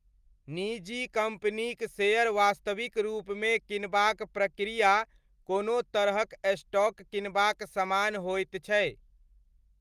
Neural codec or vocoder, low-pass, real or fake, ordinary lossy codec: none; 14.4 kHz; real; Opus, 32 kbps